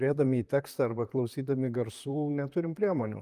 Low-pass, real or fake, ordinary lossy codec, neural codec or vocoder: 14.4 kHz; fake; Opus, 32 kbps; vocoder, 44.1 kHz, 128 mel bands, Pupu-Vocoder